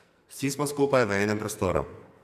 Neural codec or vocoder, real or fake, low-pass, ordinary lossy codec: codec, 44.1 kHz, 2.6 kbps, SNAC; fake; 14.4 kHz; none